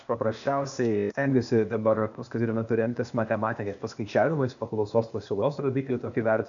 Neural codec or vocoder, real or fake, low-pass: codec, 16 kHz, 0.8 kbps, ZipCodec; fake; 7.2 kHz